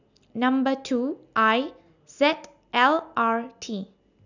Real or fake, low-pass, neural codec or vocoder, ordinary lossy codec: real; 7.2 kHz; none; none